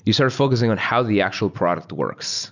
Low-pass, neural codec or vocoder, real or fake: 7.2 kHz; none; real